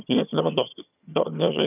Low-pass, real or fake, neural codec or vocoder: 3.6 kHz; fake; vocoder, 22.05 kHz, 80 mel bands, HiFi-GAN